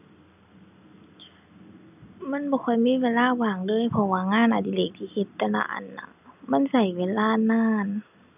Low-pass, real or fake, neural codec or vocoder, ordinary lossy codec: 3.6 kHz; real; none; none